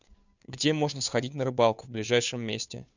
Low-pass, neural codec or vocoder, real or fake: 7.2 kHz; codec, 16 kHz, 2 kbps, FunCodec, trained on Chinese and English, 25 frames a second; fake